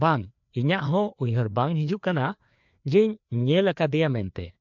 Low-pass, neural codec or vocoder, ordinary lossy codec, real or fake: 7.2 kHz; codec, 16 kHz, 2 kbps, FreqCodec, larger model; MP3, 64 kbps; fake